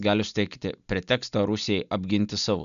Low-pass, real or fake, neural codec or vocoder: 7.2 kHz; real; none